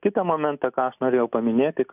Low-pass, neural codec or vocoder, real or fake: 3.6 kHz; none; real